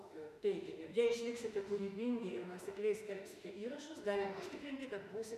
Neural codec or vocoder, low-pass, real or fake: autoencoder, 48 kHz, 32 numbers a frame, DAC-VAE, trained on Japanese speech; 14.4 kHz; fake